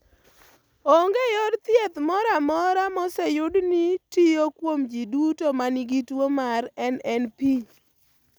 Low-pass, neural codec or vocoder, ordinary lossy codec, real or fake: none; none; none; real